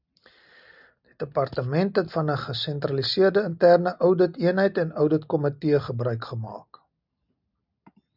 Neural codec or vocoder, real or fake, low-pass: none; real; 5.4 kHz